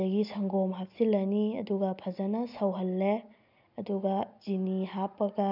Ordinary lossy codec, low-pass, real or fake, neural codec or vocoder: none; 5.4 kHz; real; none